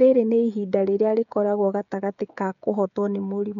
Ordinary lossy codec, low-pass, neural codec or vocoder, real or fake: none; 7.2 kHz; codec, 16 kHz, 16 kbps, FreqCodec, smaller model; fake